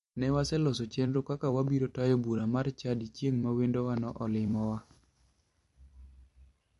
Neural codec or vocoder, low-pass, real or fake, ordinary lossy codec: vocoder, 44.1 kHz, 128 mel bands every 256 samples, BigVGAN v2; 14.4 kHz; fake; MP3, 48 kbps